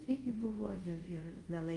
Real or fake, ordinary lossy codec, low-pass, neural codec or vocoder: fake; Opus, 32 kbps; 10.8 kHz; codec, 24 kHz, 0.5 kbps, DualCodec